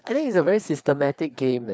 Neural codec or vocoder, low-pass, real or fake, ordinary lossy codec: codec, 16 kHz, 4 kbps, FreqCodec, larger model; none; fake; none